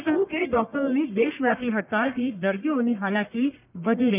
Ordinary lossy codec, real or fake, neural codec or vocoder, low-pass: none; fake; codec, 44.1 kHz, 1.7 kbps, Pupu-Codec; 3.6 kHz